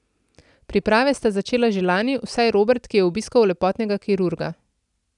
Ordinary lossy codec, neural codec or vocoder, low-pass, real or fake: none; none; 10.8 kHz; real